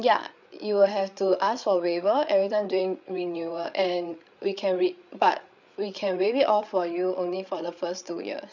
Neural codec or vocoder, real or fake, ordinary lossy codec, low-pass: codec, 16 kHz, 8 kbps, FreqCodec, larger model; fake; none; 7.2 kHz